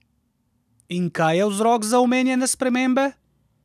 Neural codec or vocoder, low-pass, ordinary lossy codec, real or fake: none; 14.4 kHz; none; real